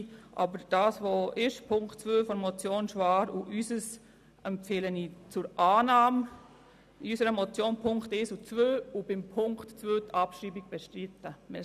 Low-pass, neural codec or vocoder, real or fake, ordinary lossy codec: 14.4 kHz; none; real; none